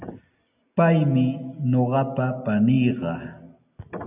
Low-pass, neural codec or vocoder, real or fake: 3.6 kHz; none; real